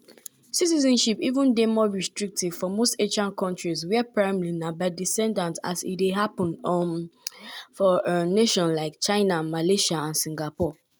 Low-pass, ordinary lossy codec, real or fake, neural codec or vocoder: none; none; real; none